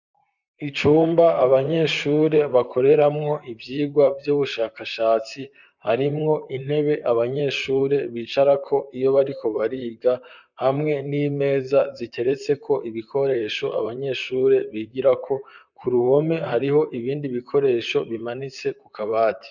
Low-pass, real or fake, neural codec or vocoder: 7.2 kHz; fake; vocoder, 44.1 kHz, 128 mel bands, Pupu-Vocoder